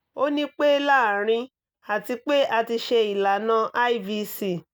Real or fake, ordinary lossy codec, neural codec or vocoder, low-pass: real; none; none; none